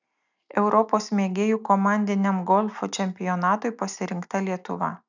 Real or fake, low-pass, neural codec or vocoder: real; 7.2 kHz; none